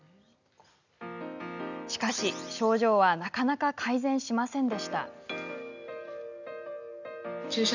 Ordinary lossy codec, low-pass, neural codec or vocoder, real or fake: none; 7.2 kHz; none; real